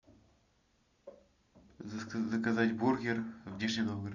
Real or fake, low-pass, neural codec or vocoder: real; 7.2 kHz; none